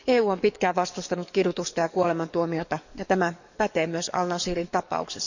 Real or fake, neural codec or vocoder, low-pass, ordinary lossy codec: fake; codec, 44.1 kHz, 7.8 kbps, DAC; 7.2 kHz; none